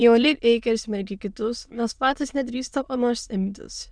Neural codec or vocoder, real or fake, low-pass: autoencoder, 22.05 kHz, a latent of 192 numbers a frame, VITS, trained on many speakers; fake; 9.9 kHz